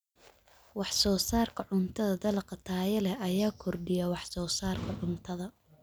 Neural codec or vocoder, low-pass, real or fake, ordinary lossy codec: none; none; real; none